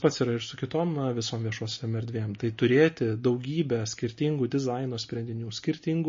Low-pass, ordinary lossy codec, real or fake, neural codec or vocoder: 7.2 kHz; MP3, 32 kbps; real; none